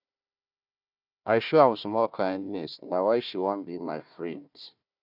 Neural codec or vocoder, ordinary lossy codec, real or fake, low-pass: codec, 16 kHz, 1 kbps, FunCodec, trained on Chinese and English, 50 frames a second; none; fake; 5.4 kHz